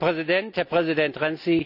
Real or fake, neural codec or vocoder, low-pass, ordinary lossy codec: real; none; 5.4 kHz; none